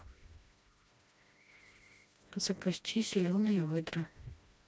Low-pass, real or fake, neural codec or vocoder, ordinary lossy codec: none; fake; codec, 16 kHz, 1 kbps, FreqCodec, smaller model; none